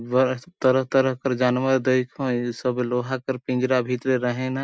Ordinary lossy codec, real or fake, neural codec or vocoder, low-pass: none; real; none; none